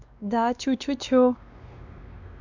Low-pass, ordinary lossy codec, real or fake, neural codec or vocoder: 7.2 kHz; none; fake; codec, 16 kHz, 2 kbps, X-Codec, WavLM features, trained on Multilingual LibriSpeech